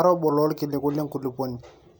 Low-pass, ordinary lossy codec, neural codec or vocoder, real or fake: none; none; none; real